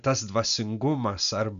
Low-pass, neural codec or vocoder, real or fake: 7.2 kHz; none; real